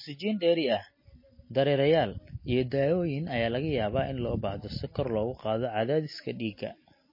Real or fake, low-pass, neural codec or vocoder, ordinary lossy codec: real; 5.4 kHz; none; MP3, 24 kbps